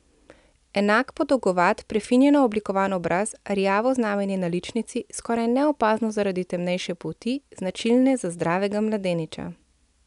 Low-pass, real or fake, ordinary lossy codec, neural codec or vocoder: 10.8 kHz; real; none; none